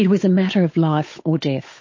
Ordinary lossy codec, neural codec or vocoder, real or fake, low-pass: MP3, 32 kbps; codec, 16 kHz, 8 kbps, FunCodec, trained on Chinese and English, 25 frames a second; fake; 7.2 kHz